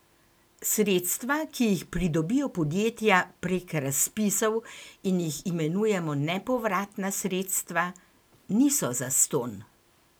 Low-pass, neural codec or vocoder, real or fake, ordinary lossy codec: none; none; real; none